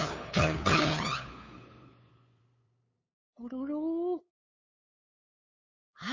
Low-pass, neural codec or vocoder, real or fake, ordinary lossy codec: 7.2 kHz; codec, 16 kHz, 8 kbps, FunCodec, trained on LibriTTS, 25 frames a second; fake; MP3, 32 kbps